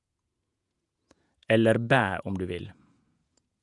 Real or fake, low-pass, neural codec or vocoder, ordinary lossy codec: fake; 10.8 kHz; vocoder, 48 kHz, 128 mel bands, Vocos; none